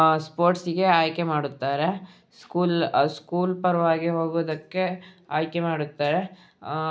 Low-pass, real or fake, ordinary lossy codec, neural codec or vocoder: none; real; none; none